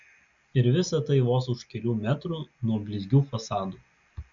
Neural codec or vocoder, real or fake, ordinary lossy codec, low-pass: none; real; MP3, 64 kbps; 7.2 kHz